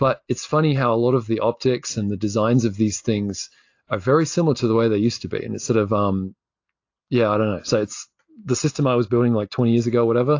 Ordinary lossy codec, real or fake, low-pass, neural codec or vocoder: AAC, 48 kbps; real; 7.2 kHz; none